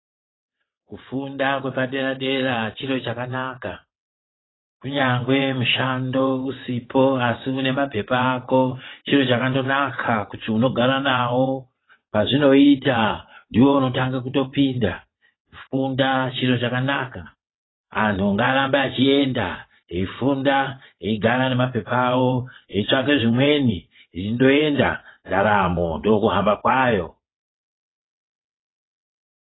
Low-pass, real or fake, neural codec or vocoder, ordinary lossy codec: 7.2 kHz; fake; vocoder, 22.05 kHz, 80 mel bands, WaveNeXt; AAC, 16 kbps